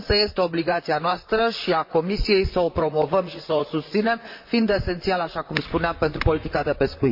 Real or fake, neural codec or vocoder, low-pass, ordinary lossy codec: fake; vocoder, 44.1 kHz, 128 mel bands, Pupu-Vocoder; 5.4 kHz; MP3, 32 kbps